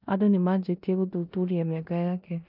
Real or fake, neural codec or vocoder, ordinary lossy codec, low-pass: fake; codec, 16 kHz in and 24 kHz out, 0.9 kbps, LongCat-Audio-Codec, four codebook decoder; none; 5.4 kHz